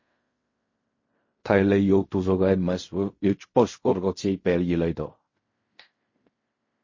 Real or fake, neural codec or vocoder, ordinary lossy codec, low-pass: fake; codec, 16 kHz in and 24 kHz out, 0.4 kbps, LongCat-Audio-Codec, fine tuned four codebook decoder; MP3, 32 kbps; 7.2 kHz